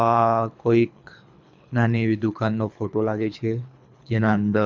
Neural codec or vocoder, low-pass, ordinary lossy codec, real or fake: codec, 24 kHz, 3 kbps, HILCodec; 7.2 kHz; MP3, 64 kbps; fake